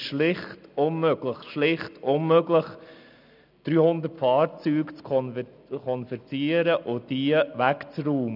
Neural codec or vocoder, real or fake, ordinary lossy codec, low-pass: none; real; none; 5.4 kHz